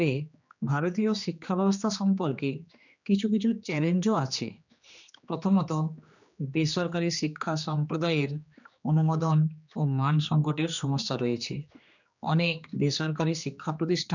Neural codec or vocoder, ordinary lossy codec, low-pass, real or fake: codec, 16 kHz, 2 kbps, X-Codec, HuBERT features, trained on general audio; none; 7.2 kHz; fake